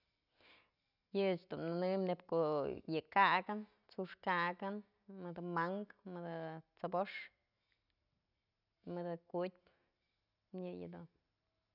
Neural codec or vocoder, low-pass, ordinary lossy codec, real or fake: none; 5.4 kHz; none; real